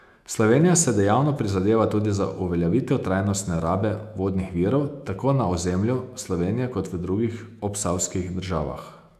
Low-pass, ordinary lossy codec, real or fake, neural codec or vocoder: 14.4 kHz; none; fake; autoencoder, 48 kHz, 128 numbers a frame, DAC-VAE, trained on Japanese speech